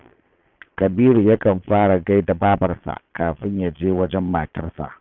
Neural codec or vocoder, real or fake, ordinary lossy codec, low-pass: vocoder, 22.05 kHz, 80 mel bands, Vocos; fake; none; 7.2 kHz